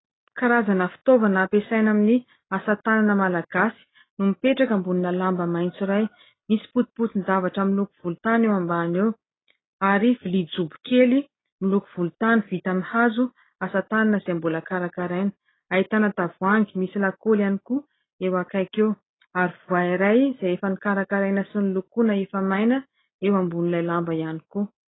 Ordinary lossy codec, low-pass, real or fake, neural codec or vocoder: AAC, 16 kbps; 7.2 kHz; real; none